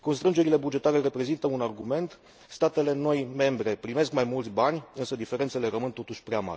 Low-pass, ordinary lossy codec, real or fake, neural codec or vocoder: none; none; real; none